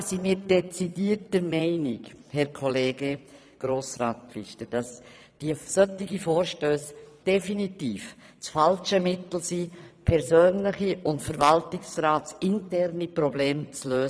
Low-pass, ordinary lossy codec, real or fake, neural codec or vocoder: none; none; fake; vocoder, 22.05 kHz, 80 mel bands, Vocos